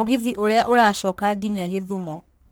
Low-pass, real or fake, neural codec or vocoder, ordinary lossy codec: none; fake; codec, 44.1 kHz, 1.7 kbps, Pupu-Codec; none